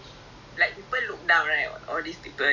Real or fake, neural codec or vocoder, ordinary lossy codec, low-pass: real; none; none; 7.2 kHz